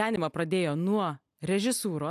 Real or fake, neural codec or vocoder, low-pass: real; none; 14.4 kHz